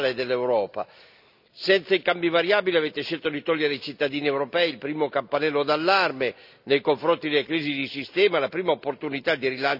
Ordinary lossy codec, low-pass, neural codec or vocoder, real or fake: none; 5.4 kHz; none; real